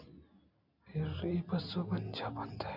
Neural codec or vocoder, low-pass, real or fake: none; 5.4 kHz; real